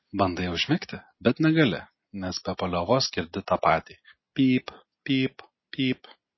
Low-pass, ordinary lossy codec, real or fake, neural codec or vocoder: 7.2 kHz; MP3, 24 kbps; real; none